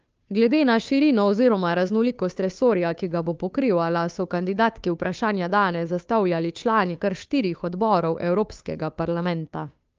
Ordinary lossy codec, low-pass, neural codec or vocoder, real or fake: Opus, 24 kbps; 7.2 kHz; codec, 16 kHz, 2 kbps, FunCodec, trained on Chinese and English, 25 frames a second; fake